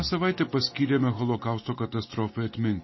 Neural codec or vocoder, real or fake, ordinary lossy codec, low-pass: none; real; MP3, 24 kbps; 7.2 kHz